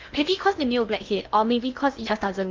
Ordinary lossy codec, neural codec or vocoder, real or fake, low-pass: Opus, 32 kbps; codec, 16 kHz in and 24 kHz out, 0.6 kbps, FocalCodec, streaming, 2048 codes; fake; 7.2 kHz